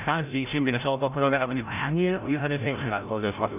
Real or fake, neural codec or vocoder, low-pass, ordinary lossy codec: fake; codec, 16 kHz, 0.5 kbps, FreqCodec, larger model; 3.6 kHz; none